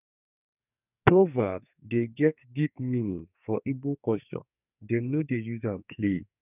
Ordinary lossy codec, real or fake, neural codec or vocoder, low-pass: none; fake; codec, 44.1 kHz, 2.6 kbps, SNAC; 3.6 kHz